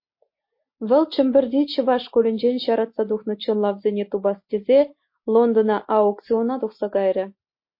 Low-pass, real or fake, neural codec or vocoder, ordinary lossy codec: 5.4 kHz; real; none; MP3, 32 kbps